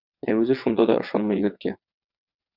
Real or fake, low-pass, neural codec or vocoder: fake; 5.4 kHz; vocoder, 22.05 kHz, 80 mel bands, WaveNeXt